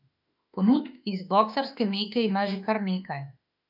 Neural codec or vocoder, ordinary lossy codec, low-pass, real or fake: autoencoder, 48 kHz, 32 numbers a frame, DAC-VAE, trained on Japanese speech; none; 5.4 kHz; fake